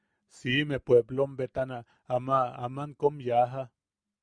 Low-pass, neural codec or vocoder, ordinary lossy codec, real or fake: 9.9 kHz; none; Opus, 64 kbps; real